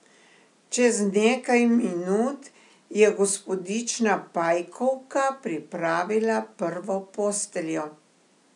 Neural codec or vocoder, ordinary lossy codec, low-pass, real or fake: none; none; 10.8 kHz; real